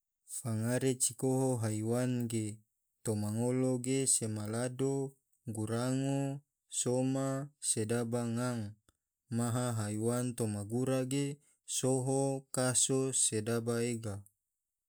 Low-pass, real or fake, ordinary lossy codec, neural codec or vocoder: none; real; none; none